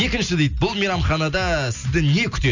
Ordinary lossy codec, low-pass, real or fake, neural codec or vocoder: none; 7.2 kHz; real; none